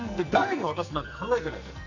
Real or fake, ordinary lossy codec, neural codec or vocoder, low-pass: fake; none; codec, 44.1 kHz, 2.6 kbps, SNAC; 7.2 kHz